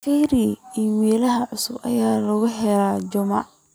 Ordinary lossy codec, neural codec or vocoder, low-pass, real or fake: none; none; none; real